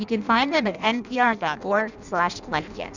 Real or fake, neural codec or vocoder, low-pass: fake; codec, 16 kHz in and 24 kHz out, 0.6 kbps, FireRedTTS-2 codec; 7.2 kHz